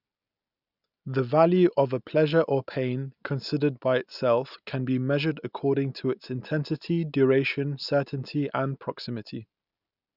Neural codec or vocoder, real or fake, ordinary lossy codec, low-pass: none; real; none; 5.4 kHz